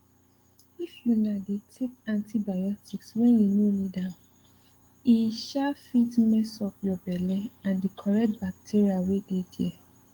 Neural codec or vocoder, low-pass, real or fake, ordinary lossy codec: codec, 44.1 kHz, 7.8 kbps, DAC; 19.8 kHz; fake; Opus, 24 kbps